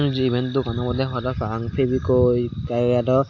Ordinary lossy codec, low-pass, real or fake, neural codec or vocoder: AAC, 48 kbps; 7.2 kHz; real; none